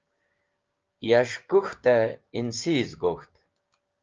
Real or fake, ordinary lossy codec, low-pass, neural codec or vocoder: fake; Opus, 24 kbps; 7.2 kHz; codec, 16 kHz, 6 kbps, DAC